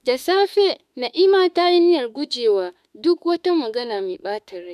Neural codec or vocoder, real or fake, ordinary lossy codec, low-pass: autoencoder, 48 kHz, 32 numbers a frame, DAC-VAE, trained on Japanese speech; fake; none; 14.4 kHz